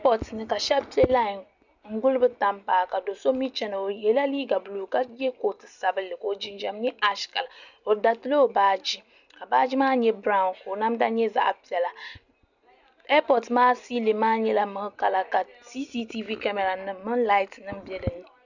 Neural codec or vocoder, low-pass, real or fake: none; 7.2 kHz; real